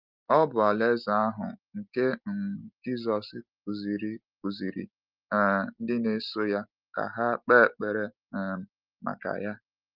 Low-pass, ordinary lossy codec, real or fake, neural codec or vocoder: 5.4 kHz; Opus, 24 kbps; real; none